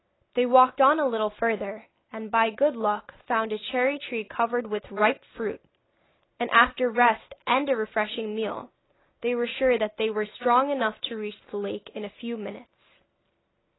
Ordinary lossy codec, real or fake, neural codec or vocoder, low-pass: AAC, 16 kbps; real; none; 7.2 kHz